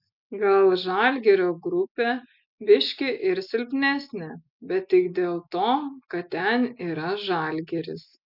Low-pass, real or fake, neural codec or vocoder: 5.4 kHz; real; none